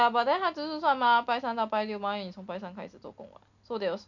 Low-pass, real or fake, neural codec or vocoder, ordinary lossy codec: 7.2 kHz; real; none; none